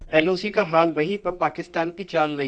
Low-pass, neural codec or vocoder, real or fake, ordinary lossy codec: 9.9 kHz; codec, 24 kHz, 0.9 kbps, WavTokenizer, medium music audio release; fake; Opus, 64 kbps